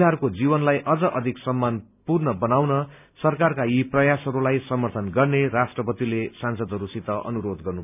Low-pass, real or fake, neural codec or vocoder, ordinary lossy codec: 3.6 kHz; real; none; none